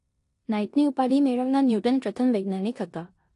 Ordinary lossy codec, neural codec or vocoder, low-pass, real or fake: AAC, 48 kbps; codec, 16 kHz in and 24 kHz out, 0.9 kbps, LongCat-Audio-Codec, four codebook decoder; 10.8 kHz; fake